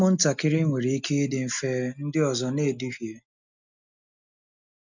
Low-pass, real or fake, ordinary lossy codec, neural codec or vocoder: 7.2 kHz; real; none; none